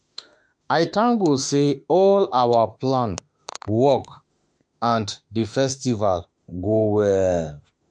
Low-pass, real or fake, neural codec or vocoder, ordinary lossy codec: 9.9 kHz; fake; autoencoder, 48 kHz, 32 numbers a frame, DAC-VAE, trained on Japanese speech; MP3, 64 kbps